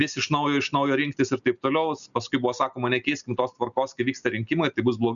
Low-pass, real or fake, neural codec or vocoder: 7.2 kHz; real; none